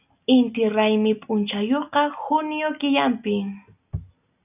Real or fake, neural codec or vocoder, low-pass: real; none; 3.6 kHz